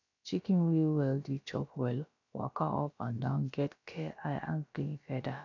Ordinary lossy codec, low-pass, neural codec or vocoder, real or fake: none; 7.2 kHz; codec, 16 kHz, about 1 kbps, DyCAST, with the encoder's durations; fake